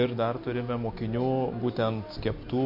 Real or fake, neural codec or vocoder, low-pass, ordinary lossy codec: real; none; 5.4 kHz; MP3, 32 kbps